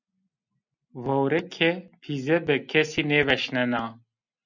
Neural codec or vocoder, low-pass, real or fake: none; 7.2 kHz; real